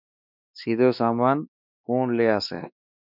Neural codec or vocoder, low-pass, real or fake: codec, 16 kHz, 2 kbps, X-Codec, WavLM features, trained on Multilingual LibriSpeech; 5.4 kHz; fake